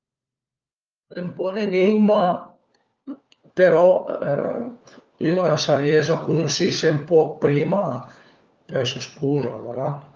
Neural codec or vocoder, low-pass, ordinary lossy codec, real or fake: codec, 16 kHz, 4 kbps, FunCodec, trained on LibriTTS, 50 frames a second; 7.2 kHz; Opus, 24 kbps; fake